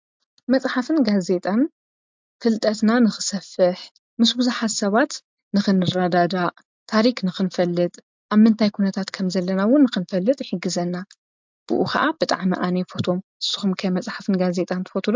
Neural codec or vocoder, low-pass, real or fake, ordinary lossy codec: none; 7.2 kHz; real; MP3, 64 kbps